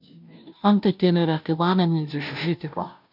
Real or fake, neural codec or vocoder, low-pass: fake; codec, 16 kHz, 0.5 kbps, FunCodec, trained on Chinese and English, 25 frames a second; 5.4 kHz